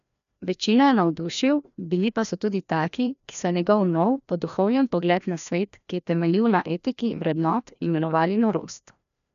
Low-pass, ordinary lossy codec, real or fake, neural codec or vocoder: 7.2 kHz; none; fake; codec, 16 kHz, 1 kbps, FreqCodec, larger model